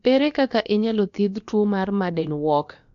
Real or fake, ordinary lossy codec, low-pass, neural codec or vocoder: fake; MP3, 64 kbps; 7.2 kHz; codec, 16 kHz, about 1 kbps, DyCAST, with the encoder's durations